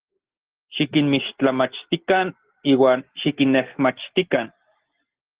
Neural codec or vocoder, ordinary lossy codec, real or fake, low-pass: none; Opus, 16 kbps; real; 3.6 kHz